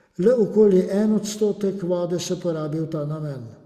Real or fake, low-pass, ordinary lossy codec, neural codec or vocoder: real; 14.4 kHz; Opus, 64 kbps; none